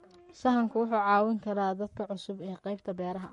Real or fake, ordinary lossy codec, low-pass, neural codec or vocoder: fake; MP3, 48 kbps; 19.8 kHz; codec, 44.1 kHz, 7.8 kbps, Pupu-Codec